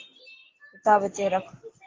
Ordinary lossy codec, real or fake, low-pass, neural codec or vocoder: Opus, 16 kbps; real; 7.2 kHz; none